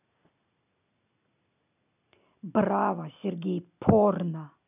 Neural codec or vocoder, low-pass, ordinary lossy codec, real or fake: none; 3.6 kHz; none; real